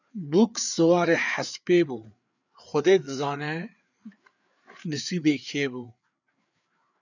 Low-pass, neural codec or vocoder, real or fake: 7.2 kHz; codec, 16 kHz, 4 kbps, FreqCodec, larger model; fake